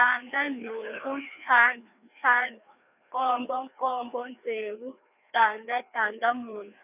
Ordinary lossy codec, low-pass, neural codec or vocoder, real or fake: none; 3.6 kHz; codec, 16 kHz, 2 kbps, FreqCodec, larger model; fake